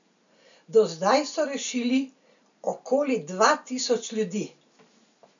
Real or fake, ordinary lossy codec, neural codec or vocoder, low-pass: real; none; none; 7.2 kHz